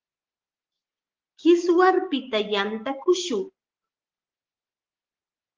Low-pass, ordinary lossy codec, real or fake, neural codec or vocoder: 7.2 kHz; Opus, 16 kbps; real; none